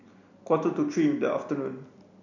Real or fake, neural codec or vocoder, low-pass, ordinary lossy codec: real; none; 7.2 kHz; none